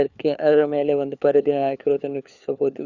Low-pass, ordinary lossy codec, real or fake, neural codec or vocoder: 7.2 kHz; Opus, 64 kbps; fake; codec, 16 kHz, 4 kbps, FunCodec, trained on LibriTTS, 50 frames a second